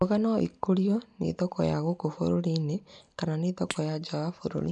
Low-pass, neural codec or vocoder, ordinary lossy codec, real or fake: 10.8 kHz; none; none; real